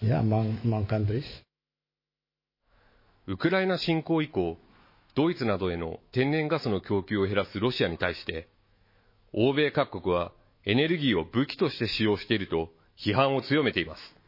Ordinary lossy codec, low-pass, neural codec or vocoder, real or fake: MP3, 24 kbps; 5.4 kHz; autoencoder, 48 kHz, 128 numbers a frame, DAC-VAE, trained on Japanese speech; fake